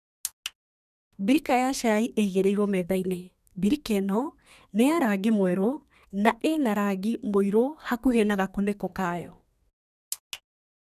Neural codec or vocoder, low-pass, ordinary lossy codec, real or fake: codec, 32 kHz, 1.9 kbps, SNAC; 14.4 kHz; none; fake